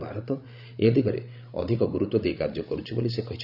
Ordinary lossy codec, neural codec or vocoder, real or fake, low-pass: none; codec, 16 kHz, 16 kbps, FreqCodec, larger model; fake; 5.4 kHz